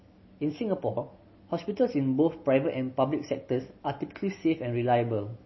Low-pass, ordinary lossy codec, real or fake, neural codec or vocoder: 7.2 kHz; MP3, 24 kbps; real; none